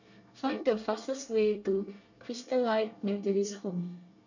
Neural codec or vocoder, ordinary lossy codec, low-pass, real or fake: codec, 24 kHz, 1 kbps, SNAC; none; 7.2 kHz; fake